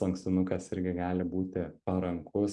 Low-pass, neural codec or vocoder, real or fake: 10.8 kHz; none; real